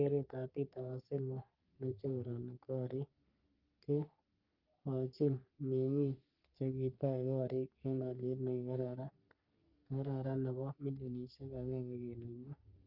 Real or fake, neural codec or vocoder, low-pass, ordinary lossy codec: fake; codec, 44.1 kHz, 3.4 kbps, Pupu-Codec; 5.4 kHz; Opus, 32 kbps